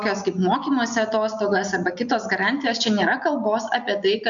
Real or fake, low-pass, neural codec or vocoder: real; 7.2 kHz; none